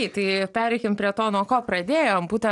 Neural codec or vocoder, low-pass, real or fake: none; 10.8 kHz; real